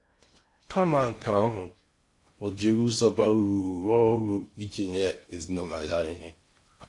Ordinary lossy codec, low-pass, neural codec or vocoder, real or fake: AAC, 48 kbps; 10.8 kHz; codec, 16 kHz in and 24 kHz out, 0.6 kbps, FocalCodec, streaming, 2048 codes; fake